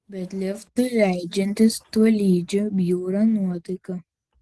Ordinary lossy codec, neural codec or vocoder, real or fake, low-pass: Opus, 16 kbps; none; real; 10.8 kHz